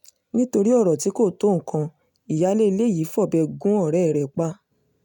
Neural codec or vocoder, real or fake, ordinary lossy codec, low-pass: none; real; none; 19.8 kHz